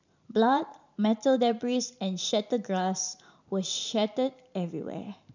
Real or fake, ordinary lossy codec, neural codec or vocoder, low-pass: fake; none; vocoder, 44.1 kHz, 128 mel bands every 512 samples, BigVGAN v2; 7.2 kHz